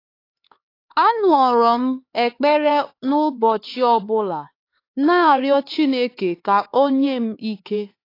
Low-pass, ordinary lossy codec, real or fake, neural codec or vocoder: 5.4 kHz; AAC, 32 kbps; fake; codec, 16 kHz, 4 kbps, X-Codec, HuBERT features, trained on LibriSpeech